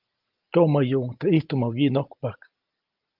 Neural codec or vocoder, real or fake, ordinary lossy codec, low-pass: none; real; Opus, 24 kbps; 5.4 kHz